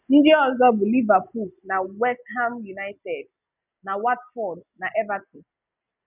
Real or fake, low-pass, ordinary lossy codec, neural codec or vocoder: real; 3.6 kHz; none; none